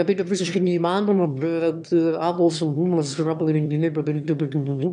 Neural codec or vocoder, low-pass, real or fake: autoencoder, 22.05 kHz, a latent of 192 numbers a frame, VITS, trained on one speaker; 9.9 kHz; fake